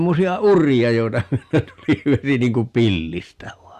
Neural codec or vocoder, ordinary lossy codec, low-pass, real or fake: none; Opus, 64 kbps; 14.4 kHz; real